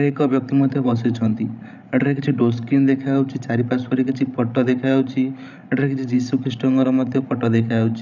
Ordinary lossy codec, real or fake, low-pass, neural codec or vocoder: none; fake; 7.2 kHz; codec, 16 kHz, 16 kbps, FreqCodec, larger model